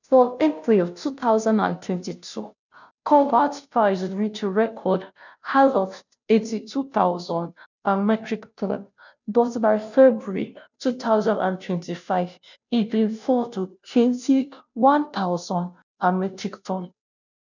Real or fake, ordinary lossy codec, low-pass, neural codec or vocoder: fake; none; 7.2 kHz; codec, 16 kHz, 0.5 kbps, FunCodec, trained on Chinese and English, 25 frames a second